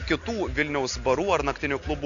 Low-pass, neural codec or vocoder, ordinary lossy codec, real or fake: 7.2 kHz; none; MP3, 96 kbps; real